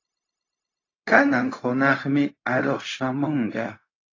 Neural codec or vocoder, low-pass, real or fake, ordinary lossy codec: codec, 16 kHz, 0.4 kbps, LongCat-Audio-Codec; 7.2 kHz; fake; AAC, 32 kbps